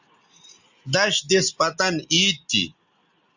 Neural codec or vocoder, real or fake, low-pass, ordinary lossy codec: none; real; 7.2 kHz; Opus, 64 kbps